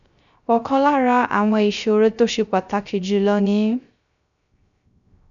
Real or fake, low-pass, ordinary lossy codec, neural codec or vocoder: fake; 7.2 kHz; none; codec, 16 kHz, 0.3 kbps, FocalCodec